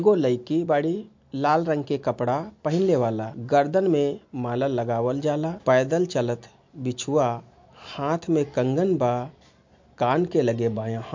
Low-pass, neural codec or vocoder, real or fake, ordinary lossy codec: 7.2 kHz; none; real; MP3, 48 kbps